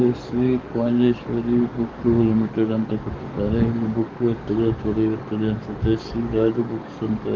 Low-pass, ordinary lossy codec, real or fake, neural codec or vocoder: 7.2 kHz; Opus, 32 kbps; fake; codec, 44.1 kHz, 7.8 kbps, DAC